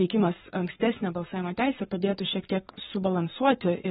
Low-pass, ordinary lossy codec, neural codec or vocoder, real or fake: 19.8 kHz; AAC, 16 kbps; codec, 44.1 kHz, 7.8 kbps, Pupu-Codec; fake